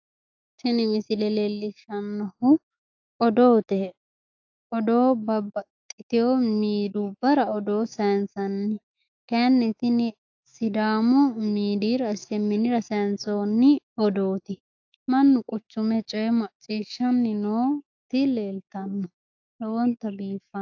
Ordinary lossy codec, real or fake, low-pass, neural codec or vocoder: AAC, 48 kbps; fake; 7.2 kHz; autoencoder, 48 kHz, 128 numbers a frame, DAC-VAE, trained on Japanese speech